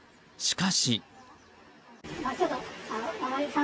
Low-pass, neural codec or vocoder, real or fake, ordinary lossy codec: none; none; real; none